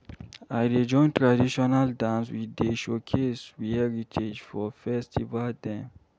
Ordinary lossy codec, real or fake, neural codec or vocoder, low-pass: none; real; none; none